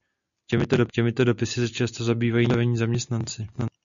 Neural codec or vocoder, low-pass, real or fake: none; 7.2 kHz; real